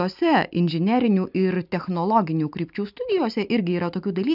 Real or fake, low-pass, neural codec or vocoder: real; 5.4 kHz; none